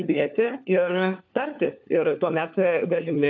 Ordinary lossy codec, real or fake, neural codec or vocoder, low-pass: AAC, 48 kbps; fake; codec, 16 kHz, 4 kbps, FunCodec, trained on LibriTTS, 50 frames a second; 7.2 kHz